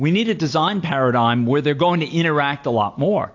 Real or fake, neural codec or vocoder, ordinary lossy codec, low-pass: real; none; AAC, 48 kbps; 7.2 kHz